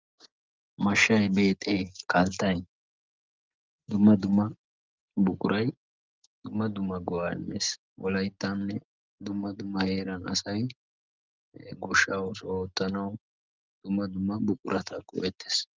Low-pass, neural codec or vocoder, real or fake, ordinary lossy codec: 7.2 kHz; none; real; Opus, 24 kbps